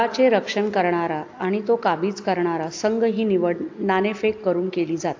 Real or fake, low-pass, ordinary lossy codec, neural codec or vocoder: real; 7.2 kHz; AAC, 48 kbps; none